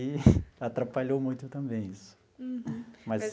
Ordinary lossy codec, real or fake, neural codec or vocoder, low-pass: none; real; none; none